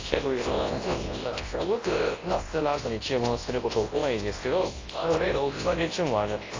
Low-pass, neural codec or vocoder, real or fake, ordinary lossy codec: 7.2 kHz; codec, 24 kHz, 0.9 kbps, WavTokenizer, large speech release; fake; AAC, 32 kbps